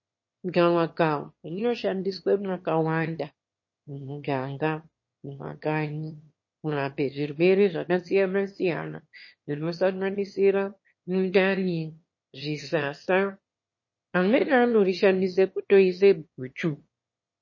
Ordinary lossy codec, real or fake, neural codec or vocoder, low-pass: MP3, 32 kbps; fake; autoencoder, 22.05 kHz, a latent of 192 numbers a frame, VITS, trained on one speaker; 7.2 kHz